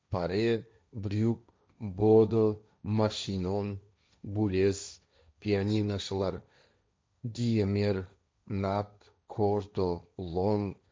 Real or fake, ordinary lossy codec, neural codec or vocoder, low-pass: fake; none; codec, 16 kHz, 1.1 kbps, Voila-Tokenizer; none